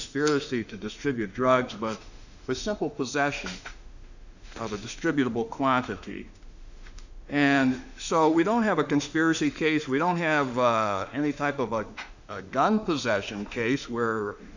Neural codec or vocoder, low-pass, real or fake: autoencoder, 48 kHz, 32 numbers a frame, DAC-VAE, trained on Japanese speech; 7.2 kHz; fake